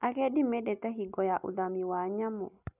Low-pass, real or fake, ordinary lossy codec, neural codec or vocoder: 3.6 kHz; real; none; none